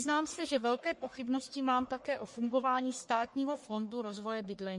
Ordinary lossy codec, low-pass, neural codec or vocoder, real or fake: MP3, 48 kbps; 10.8 kHz; codec, 44.1 kHz, 1.7 kbps, Pupu-Codec; fake